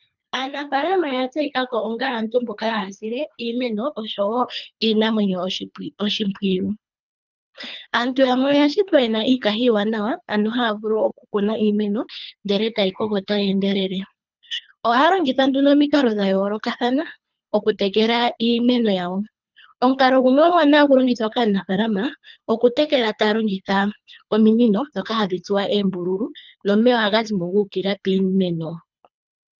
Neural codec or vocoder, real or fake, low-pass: codec, 24 kHz, 3 kbps, HILCodec; fake; 7.2 kHz